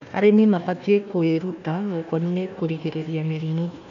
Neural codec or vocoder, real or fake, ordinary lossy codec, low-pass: codec, 16 kHz, 1 kbps, FunCodec, trained on Chinese and English, 50 frames a second; fake; none; 7.2 kHz